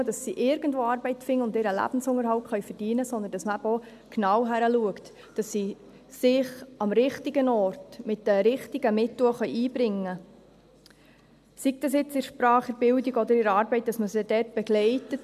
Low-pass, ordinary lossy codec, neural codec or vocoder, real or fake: 14.4 kHz; none; none; real